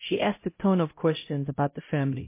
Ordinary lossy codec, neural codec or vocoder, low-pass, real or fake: MP3, 24 kbps; codec, 16 kHz, 0.5 kbps, X-Codec, HuBERT features, trained on LibriSpeech; 3.6 kHz; fake